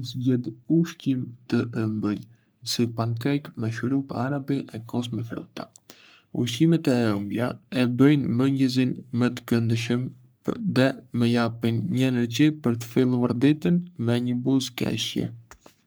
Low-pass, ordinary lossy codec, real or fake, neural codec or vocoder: none; none; fake; codec, 44.1 kHz, 3.4 kbps, Pupu-Codec